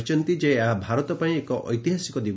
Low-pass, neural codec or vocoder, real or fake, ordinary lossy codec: none; none; real; none